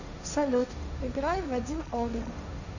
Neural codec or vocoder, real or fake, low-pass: codec, 16 kHz, 1.1 kbps, Voila-Tokenizer; fake; 7.2 kHz